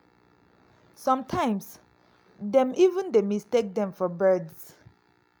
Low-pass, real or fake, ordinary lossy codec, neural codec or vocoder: none; real; none; none